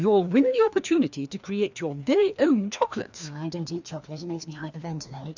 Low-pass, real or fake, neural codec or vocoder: 7.2 kHz; fake; codec, 16 kHz, 2 kbps, FreqCodec, larger model